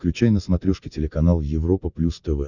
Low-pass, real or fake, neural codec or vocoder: 7.2 kHz; real; none